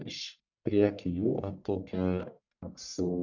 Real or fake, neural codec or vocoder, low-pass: fake; codec, 44.1 kHz, 1.7 kbps, Pupu-Codec; 7.2 kHz